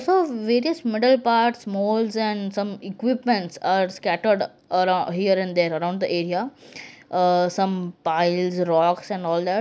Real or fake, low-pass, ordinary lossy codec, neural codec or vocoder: real; none; none; none